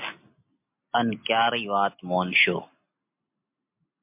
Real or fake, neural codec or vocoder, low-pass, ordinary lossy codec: real; none; 3.6 kHz; MP3, 32 kbps